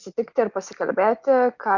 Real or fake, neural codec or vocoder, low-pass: real; none; 7.2 kHz